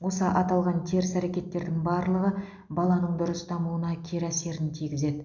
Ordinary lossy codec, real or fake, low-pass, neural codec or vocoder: none; real; 7.2 kHz; none